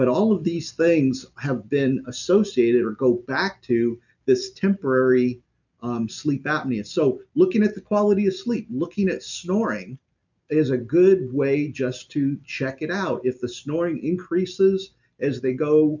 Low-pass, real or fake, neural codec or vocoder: 7.2 kHz; real; none